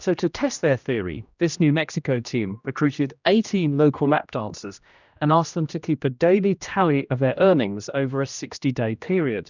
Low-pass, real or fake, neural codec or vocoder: 7.2 kHz; fake; codec, 16 kHz, 1 kbps, X-Codec, HuBERT features, trained on general audio